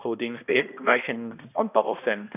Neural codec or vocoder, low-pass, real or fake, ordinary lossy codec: codec, 16 kHz, 1 kbps, X-Codec, HuBERT features, trained on balanced general audio; 3.6 kHz; fake; none